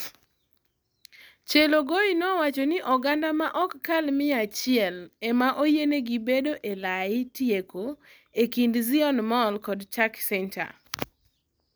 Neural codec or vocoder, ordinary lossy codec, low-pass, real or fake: none; none; none; real